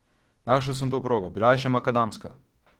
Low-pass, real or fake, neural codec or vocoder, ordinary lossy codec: 19.8 kHz; fake; autoencoder, 48 kHz, 32 numbers a frame, DAC-VAE, trained on Japanese speech; Opus, 16 kbps